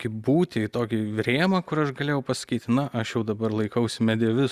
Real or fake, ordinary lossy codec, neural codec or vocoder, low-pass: real; Opus, 64 kbps; none; 14.4 kHz